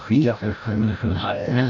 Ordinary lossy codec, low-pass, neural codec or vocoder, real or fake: none; 7.2 kHz; codec, 16 kHz, 0.5 kbps, FreqCodec, larger model; fake